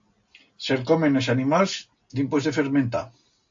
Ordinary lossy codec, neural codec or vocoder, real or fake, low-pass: AAC, 64 kbps; none; real; 7.2 kHz